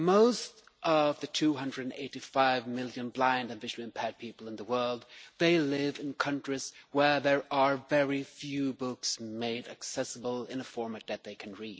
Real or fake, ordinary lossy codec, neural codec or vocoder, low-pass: real; none; none; none